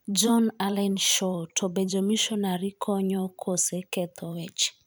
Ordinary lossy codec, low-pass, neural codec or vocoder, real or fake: none; none; vocoder, 44.1 kHz, 128 mel bands every 512 samples, BigVGAN v2; fake